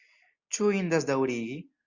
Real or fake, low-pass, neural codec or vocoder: real; 7.2 kHz; none